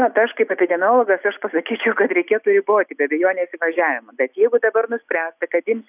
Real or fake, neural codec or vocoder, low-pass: real; none; 3.6 kHz